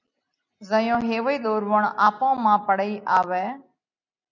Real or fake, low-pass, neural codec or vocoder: real; 7.2 kHz; none